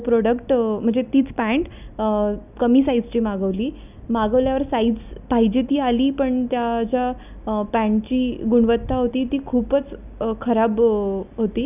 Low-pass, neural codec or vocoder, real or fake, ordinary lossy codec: 3.6 kHz; none; real; none